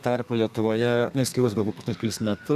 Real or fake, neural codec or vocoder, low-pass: fake; codec, 32 kHz, 1.9 kbps, SNAC; 14.4 kHz